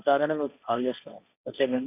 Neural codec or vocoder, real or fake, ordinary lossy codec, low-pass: codec, 16 kHz, 1.1 kbps, Voila-Tokenizer; fake; none; 3.6 kHz